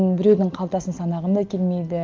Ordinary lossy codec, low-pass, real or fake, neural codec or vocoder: Opus, 24 kbps; 7.2 kHz; real; none